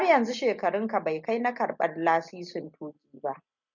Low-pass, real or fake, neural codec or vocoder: 7.2 kHz; real; none